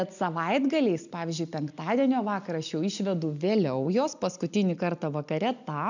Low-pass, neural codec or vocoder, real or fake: 7.2 kHz; none; real